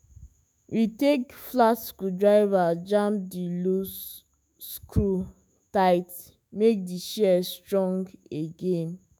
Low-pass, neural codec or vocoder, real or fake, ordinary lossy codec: none; autoencoder, 48 kHz, 128 numbers a frame, DAC-VAE, trained on Japanese speech; fake; none